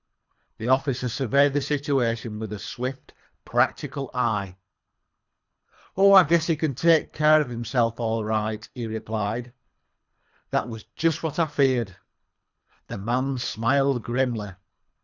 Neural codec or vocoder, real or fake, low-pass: codec, 24 kHz, 3 kbps, HILCodec; fake; 7.2 kHz